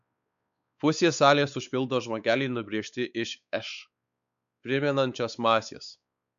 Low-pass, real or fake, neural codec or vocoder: 7.2 kHz; fake; codec, 16 kHz, 4 kbps, X-Codec, WavLM features, trained on Multilingual LibriSpeech